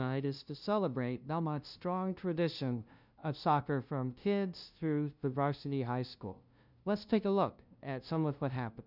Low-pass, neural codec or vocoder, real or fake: 5.4 kHz; codec, 16 kHz, 0.5 kbps, FunCodec, trained on LibriTTS, 25 frames a second; fake